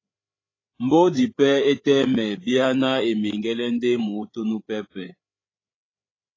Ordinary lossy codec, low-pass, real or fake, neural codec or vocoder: AAC, 32 kbps; 7.2 kHz; fake; codec, 16 kHz, 8 kbps, FreqCodec, larger model